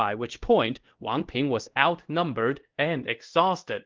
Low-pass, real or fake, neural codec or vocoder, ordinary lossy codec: 7.2 kHz; fake; codec, 24 kHz, 0.5 kbps, DualCodec; Opus, 16 kbps